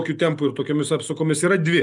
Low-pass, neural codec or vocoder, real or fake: 10.8 kHz; none; real